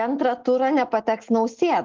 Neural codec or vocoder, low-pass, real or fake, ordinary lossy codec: none; 7.2 kHz; real; Opus, 16 kbps